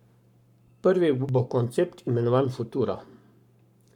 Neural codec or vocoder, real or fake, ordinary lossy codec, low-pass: codec, 44.1 kHz, 7.8 kbps, Pupu-Codec; fake; none; 19.8 kHz